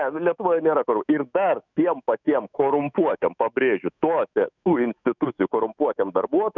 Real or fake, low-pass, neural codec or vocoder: fake; 7.2 kHz; autoencoder, 48 kHz, 128 numbers a frame, DAC-VAE, trained on Japanese speech